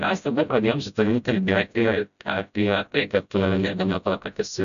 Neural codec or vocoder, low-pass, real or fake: codec, 16 kHz, 0.5 kbps, FreqCodec, smaller model; 7.2 kHz; fake